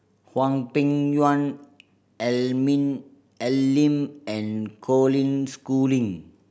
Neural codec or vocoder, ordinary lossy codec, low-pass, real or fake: none; none; none; real